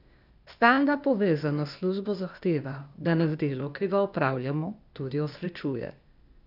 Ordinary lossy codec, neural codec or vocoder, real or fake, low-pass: none; codec, 16 kHz, 0.8 kbps, ZipCodec; fake; 5.4 kHz